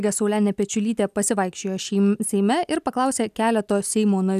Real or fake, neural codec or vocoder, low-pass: real; none; 14.4 kHz